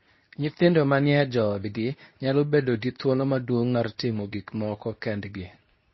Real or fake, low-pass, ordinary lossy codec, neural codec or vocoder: fake; 7.2 kHz; MP3, 24 kbps; codec, 24 kHz, 0.9 kbps, WavTokenizer, medium speech release version 2